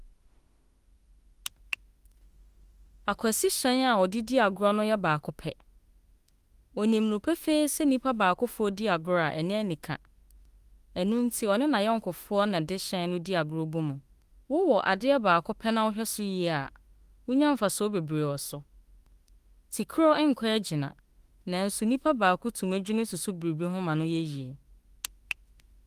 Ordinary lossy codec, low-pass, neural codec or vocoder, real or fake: Opus, 24 kbps; 14.4 kHz; autoencoder, 48 kHz, 32 numbers a frame, DAC-VAE, trained on Japanese speech; fake